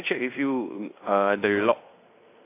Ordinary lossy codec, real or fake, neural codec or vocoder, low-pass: AAC, 24 kbps; fake; codec, 16 kHz in and 24 kHz out, 0.9 kbps, LongCat-Audio-Codec, fine tuned four codebook decoder; 3.6 kHz